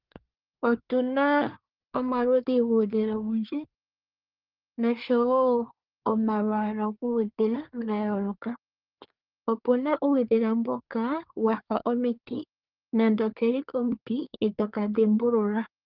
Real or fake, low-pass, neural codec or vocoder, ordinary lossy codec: fake; 5.4 kHz; codec, 24 kHz, 1 kbps, SNAC; Opus, 32 kbps